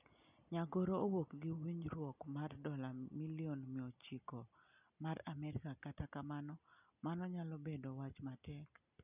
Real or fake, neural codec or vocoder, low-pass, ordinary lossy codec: real; none; 3.6 kHz; none